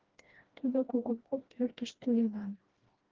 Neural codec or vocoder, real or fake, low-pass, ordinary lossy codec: codec, 16 kHz, 1 kbps, FreqCodec, smaller model; fake; 7.2 kHz; Opus, 16 kbps